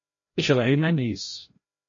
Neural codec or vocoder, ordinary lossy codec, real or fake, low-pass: codec, 16 kHz, 0.5 kbps, FreqCodec, larger model; MP3, 32 kbps; fake; 7.2 kHz